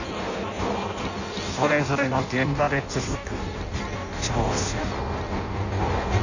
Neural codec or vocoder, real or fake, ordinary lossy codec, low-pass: codec, 16 kHz in and 24 kHz out, 0.6 kbps, FireRedTTS-2 codec; fake; none; 7.2 kHz